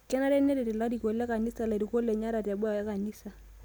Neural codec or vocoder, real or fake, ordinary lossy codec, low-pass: none; real; none; none